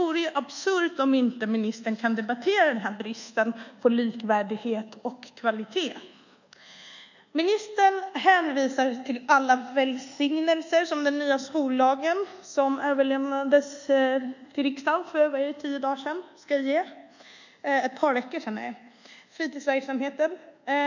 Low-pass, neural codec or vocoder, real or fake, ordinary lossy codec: 7.2 kHz; codec, 24 kHz, 1.2 kbps, DualCodec; fake; none